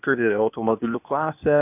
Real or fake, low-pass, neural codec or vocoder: fake; 3.6 kHz; codec, 16 kHz, 0.8 kbps, ZipCodec